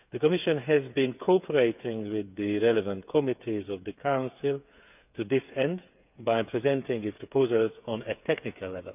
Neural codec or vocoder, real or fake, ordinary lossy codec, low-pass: codec, 16 kHz, 8 kbps, FreqCodec, smaller model; fake; none; 3.6 kHz